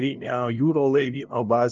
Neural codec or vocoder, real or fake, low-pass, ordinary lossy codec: codec, 16 kHz, 0.5 kbps, FunCodec, trained on LibriTTS, 25 frames a second; fake; 7.2 kHz; Opus, 24 kbps